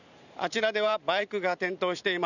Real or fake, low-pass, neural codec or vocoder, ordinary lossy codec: fake; 7.2 kHz; vocoder, 44.1 kHz, 128 mel bands every 512 samples, BigVGAN v2; none